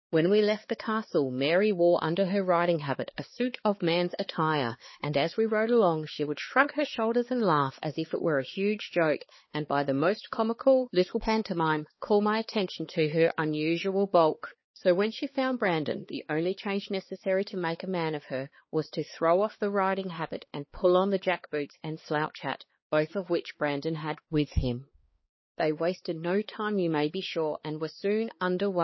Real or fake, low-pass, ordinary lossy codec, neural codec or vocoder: fake; 7.2 kHz; MP3, 24 kbps; codec, 16 kHz, 4 kbps, X-Codec, HuBERT features, trained on balanced general audio